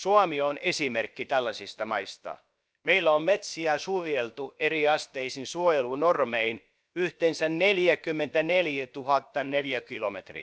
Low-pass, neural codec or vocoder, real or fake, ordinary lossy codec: none; codec, 16 kHz, about 1 kbps, DyCAST, with the encoder's durations; fake; none